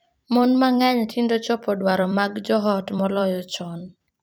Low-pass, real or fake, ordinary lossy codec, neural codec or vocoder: none; fake; none; vocoder, 44.1 kHz, 128 mel bands every 256 samples, BigVGAN v2